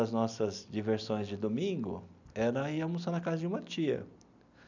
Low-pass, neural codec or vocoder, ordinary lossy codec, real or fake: 7.2 kHz; none; none; real